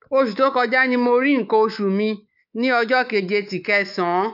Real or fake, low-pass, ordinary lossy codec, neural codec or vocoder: fake; 5.4 kHz; none; codec, 24 kHz, 3.1 kbps, DualCodec